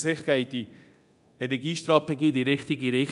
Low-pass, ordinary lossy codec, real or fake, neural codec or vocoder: 10.8 kHz; none; fake; codec, 24 kHz, 0.9 kbps, DualCodec